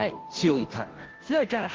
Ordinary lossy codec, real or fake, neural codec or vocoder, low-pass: Opus, 16 kbps; fake; codec, 16 kHz, 0.5 kbps, FunCodec, trained on Chinese and English, 25 frames a second; 7.2 kHz